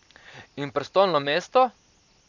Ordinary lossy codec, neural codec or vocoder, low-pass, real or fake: none; none; 7.2 kHz; real